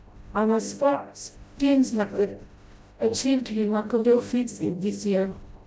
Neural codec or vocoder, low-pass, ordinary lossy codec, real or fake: codec, 16 kHz, 0.5 kbps, FreqCodec, smaller model; none; none; fake